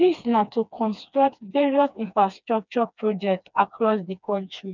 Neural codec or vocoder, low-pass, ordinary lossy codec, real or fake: codec, 16 kHz, 2 kbps, FreqCodec, smaller model; 7.2 kHz; none; fake